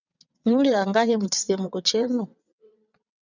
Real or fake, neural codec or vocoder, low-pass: fake; vocoder, 22.05 kHz, 80 mel bands, WaveNeXt; 7.2 kHz